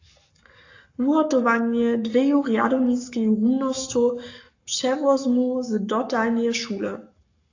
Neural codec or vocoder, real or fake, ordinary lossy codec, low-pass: codec, 44.1 kHz, 7.8 kbps, Pupu-Codec; fake; AAC, 48 kbps; 7.2 kHz